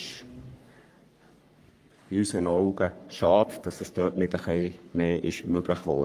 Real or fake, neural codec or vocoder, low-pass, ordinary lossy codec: fake; codec, 44.1 kHz, 3.4 kbps, Pupu-Codec; 14.4 kHz; Opus, 32 kbps